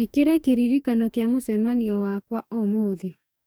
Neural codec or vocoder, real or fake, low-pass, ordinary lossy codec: codec, 44.1 kHz, 2.6 kbps, DAC; fake; none; none